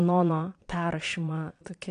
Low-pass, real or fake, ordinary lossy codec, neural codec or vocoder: 9.9 kHz; fake; AAC, 48 kbps; vocoder, 22.05 kHz, 80 mel bands, Vocos